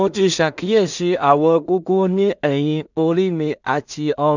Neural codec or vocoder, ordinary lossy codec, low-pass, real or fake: codec, 16 kHz in and 24 kHz out, 0.4 kbps, LongCat-Audio-Codec, two codebook decoder; none; 7.2 kHz; fake